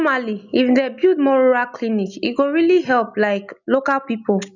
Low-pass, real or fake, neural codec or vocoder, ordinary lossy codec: 7.2 kHz; real; none; none